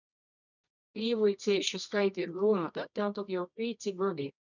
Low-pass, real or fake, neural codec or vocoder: 7.2 kHz; fake; codec, 24 kHz, 0.9 kbps, WavTokenizer, medium music audio release